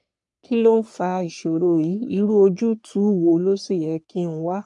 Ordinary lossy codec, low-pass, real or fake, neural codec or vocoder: AAC, 64 kbps; 10.8 kHz; fake; codec, 44.1 kHz, 3.4 kbps, Pupu-Codec